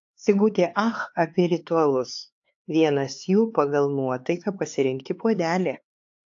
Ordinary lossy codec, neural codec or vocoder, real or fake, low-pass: AAC, 48 kbps; codec, 16 kHz, 4 kbps, X-Codec, HuBERT features, trained on LibriSpeech; fake; 7.2 kHz